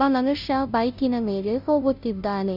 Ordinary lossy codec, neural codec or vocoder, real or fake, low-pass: none; codec, 16 kHz, 0.5 kbps, FunCodec, trained on Chinese and English, 25 frames a second; fake; 5.4 kHz